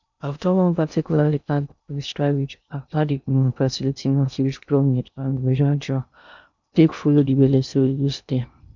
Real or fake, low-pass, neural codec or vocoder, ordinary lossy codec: fake; 7.2 kHz; codec, 16 kHz in and 24 kHz out, 0.6 kbps, FocalCodec, streaming, 2048 codes; none